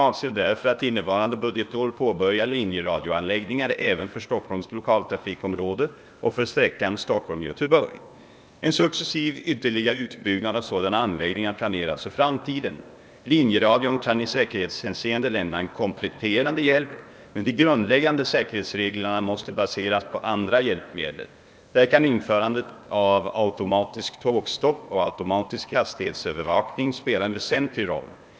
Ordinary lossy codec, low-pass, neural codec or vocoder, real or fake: none; none; codec, 16 kHz, 0.8 kbps, ZipCodec; fake